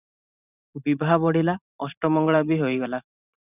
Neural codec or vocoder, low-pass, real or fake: vocoder, 44.1 kHz, 128 mel bands every 512 samples, BigVGAN v2; 3.6 kHz; fake